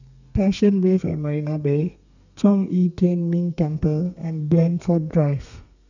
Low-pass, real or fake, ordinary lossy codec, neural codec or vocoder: 7.2 kHz; fake; none; codec, 32 kHz, 1.9 kbps, SNAC